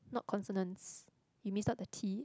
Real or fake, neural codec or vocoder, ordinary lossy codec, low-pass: real; none; none; none